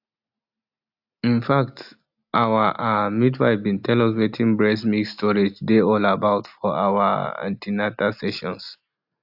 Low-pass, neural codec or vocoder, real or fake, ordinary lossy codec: 5.4 kHz; none; real; AAC, 48 kbps